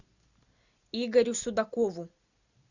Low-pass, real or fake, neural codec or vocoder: 7.2 kHz; real; none